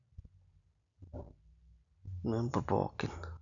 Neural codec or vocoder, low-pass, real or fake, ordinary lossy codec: none; 7.2 kHz; real; none